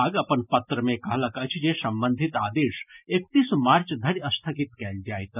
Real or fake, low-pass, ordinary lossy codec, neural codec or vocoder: real; 3.6 kHz; none; none